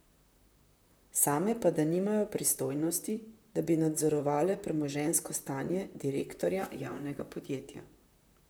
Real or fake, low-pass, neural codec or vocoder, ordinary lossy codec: fake; none; vocoder, 44.1 kHz, 128 mel bands, Pupu-Vocoder; none